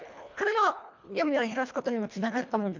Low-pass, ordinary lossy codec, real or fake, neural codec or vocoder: 7.2 kHz; MP3, 64 kbps; fake; codec, 24 kHz, 1.5 kbps, HILCodec